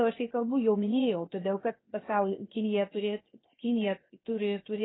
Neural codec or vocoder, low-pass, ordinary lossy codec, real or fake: codec, 16 kHz, 0.7 kbps, FocalCodec; 7.2 kHz; AAC, 16 kbps; fake